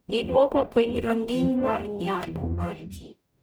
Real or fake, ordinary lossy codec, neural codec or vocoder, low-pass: fake; none; codec, 44.1 kHz, 0.9 kbps, DAC; none